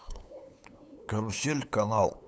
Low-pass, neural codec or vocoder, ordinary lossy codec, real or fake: none; codec, 16 kHz, 8 kbps, FunCodec, trained on LibriTTS, 25 frames a second; none; fake